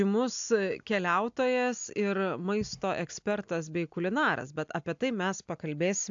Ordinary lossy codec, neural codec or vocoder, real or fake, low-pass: AAC, 64 kbps; none; real; 7.2 kHz